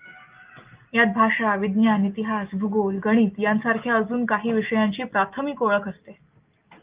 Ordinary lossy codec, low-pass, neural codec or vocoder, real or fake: Opus, 32 kbps; 3.6 kHz; none; real